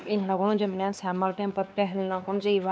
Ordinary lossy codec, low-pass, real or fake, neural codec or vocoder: none; none; fake; codec, 16 kHz, 2 kbps, X-Codec, WavLM features, trained on Multilingual LibriSpeech